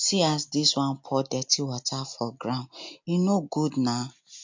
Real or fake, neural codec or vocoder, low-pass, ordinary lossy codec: real; none; 7.2 kHz; MP3, 48 kbps